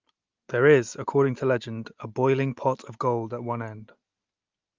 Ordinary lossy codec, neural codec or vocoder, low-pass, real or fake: Opus, 32 kbps; none; 7.2 kHz; real